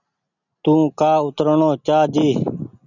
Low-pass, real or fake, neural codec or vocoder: 7.2 kHz; real; none